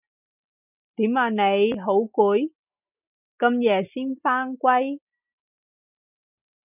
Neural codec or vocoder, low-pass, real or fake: none; 3.6 kHz; real